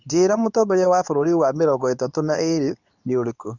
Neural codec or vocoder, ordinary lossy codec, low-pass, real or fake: codec, 24 kHz, 0.9 kbps, WavTokenizer, medium speech release version 2; none; 7.2 kHz; fake